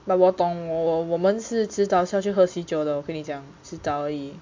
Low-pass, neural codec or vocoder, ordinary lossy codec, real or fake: 7.2 kHz; none; MP3, 48 kbps; real